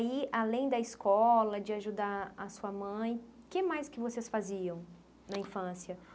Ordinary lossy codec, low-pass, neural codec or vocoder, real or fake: none; none; none; real